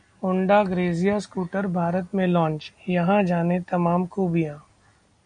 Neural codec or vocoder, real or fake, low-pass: none; real; 9.9 kHz